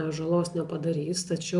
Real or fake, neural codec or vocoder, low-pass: real; none; 10.8 kHz